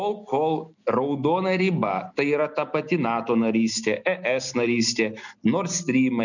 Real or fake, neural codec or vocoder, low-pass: real; none; 7.2 kHz